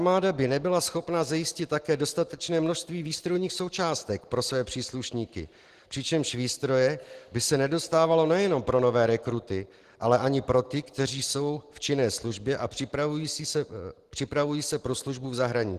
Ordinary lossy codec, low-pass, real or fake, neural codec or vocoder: Opus, 16 kbps; 14.4 kHz; real; none